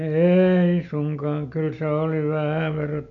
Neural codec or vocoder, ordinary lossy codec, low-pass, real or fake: none; none; 7.2 kHz; real